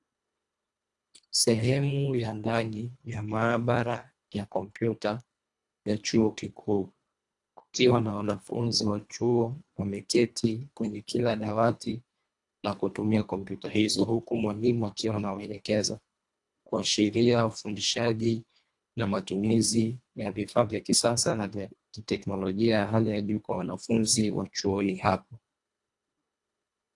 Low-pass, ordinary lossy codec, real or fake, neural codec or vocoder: 10.8 kHz; AAC, 64 kbps; fake; codec, 24 kHz, 1.5 kbps, HILCodec